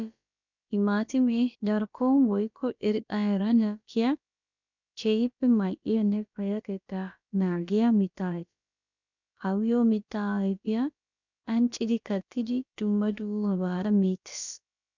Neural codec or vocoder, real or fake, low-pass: codec, 16 kHz, about 1 kbps, DyCAST, with the encoder's durations; fake; 7.2 kHz